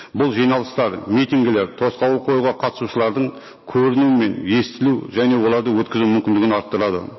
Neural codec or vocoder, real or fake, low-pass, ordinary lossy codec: none; real; 7.2 kHz; MP3, 24 kbps